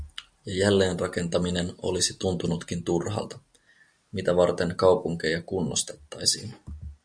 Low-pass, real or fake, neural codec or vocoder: 9.9 kHz; real; none